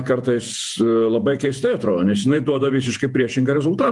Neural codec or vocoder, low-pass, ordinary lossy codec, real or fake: vocoder, 48 kHz, 128 mel bands, Vocos; 10.8 kHz; Opus, 32 kbps; fake